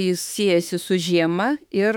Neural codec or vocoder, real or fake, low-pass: autoencoder, 48 kHz, 32 numbers a frame, DAC-VAE, trained on Japanese speech; fake; 19.8 kHz